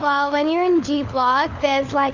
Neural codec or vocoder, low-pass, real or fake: codec, 16 kHz, 4 kbps, FunCodec, trained on LibriTTS, 50 frames a second; 7.2 kHz; fake